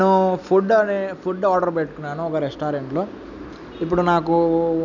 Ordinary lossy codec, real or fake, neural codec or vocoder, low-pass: none; real; none; 7.2 kHz